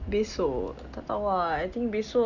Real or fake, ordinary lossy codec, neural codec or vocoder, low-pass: real; none; none; 7.2 kHz